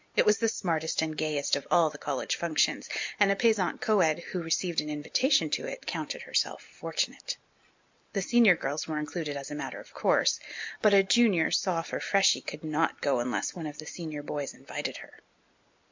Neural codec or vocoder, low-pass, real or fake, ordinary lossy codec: none; 7.2 kHz; real; MP3, 64 kbps